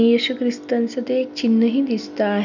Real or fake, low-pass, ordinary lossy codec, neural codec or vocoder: real; 7.2 kHz; none; none